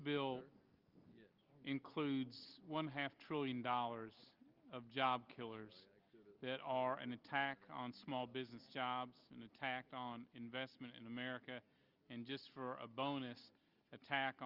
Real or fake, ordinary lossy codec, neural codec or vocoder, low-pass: real; Opus, 24 kbps; none; 5.4 kHz